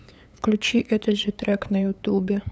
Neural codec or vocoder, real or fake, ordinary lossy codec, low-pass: codec, 16 kHz, 8 kbps, FunCodec, trained on LibriTTS, 25 frames a second; fake; none; none